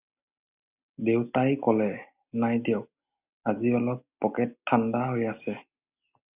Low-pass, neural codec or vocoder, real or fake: 3.6 kHz; none; real